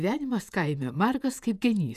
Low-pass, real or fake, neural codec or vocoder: 14.4 kHz; fake; vocoder, 48 kHz, 128 mel bands, Vocos